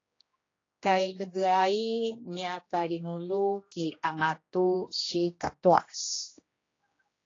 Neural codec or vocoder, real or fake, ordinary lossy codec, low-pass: codec, 16 kHz, 1 kbps, X-Codec, HuBERT features, trained on general audio; fake; AAC, 32 kbps; 7.2 kHz